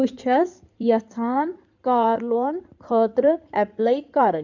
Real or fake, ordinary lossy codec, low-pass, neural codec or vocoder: fake; none; 7.2 kHz; codec, 16 kHz in and 24 kHz out, 2.2 kbps, FireRedTTS-2 codec